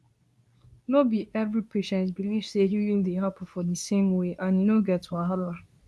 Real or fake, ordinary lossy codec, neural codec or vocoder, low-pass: fake; none; codec, 24 kHz, 0.9 kbps, WavTokenizer, medium speech release version 2; none